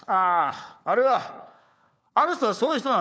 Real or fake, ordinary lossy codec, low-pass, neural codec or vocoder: fake; none; none; codec, 16 kHz, 4 kbps, FunCodec, trained on LibriTTS, 50 frames a second